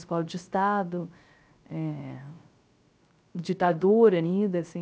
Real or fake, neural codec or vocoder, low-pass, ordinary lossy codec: fake; codec, 16 kHz, 0.3 kbps, FocalCodec; none; none